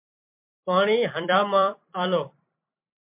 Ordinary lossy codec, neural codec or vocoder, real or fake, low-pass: MP3, 32 kbps; vocoder, 44.1 kHz, 128 mel bands every 256 samples, BigVGAN v2; fake; 3.6 kHz